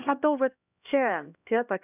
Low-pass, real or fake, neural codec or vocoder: 3.6 kHz; fake; codec, 16 kHz, 1 kbps, X-Codec, HuBERT features, trained on LibriSpeech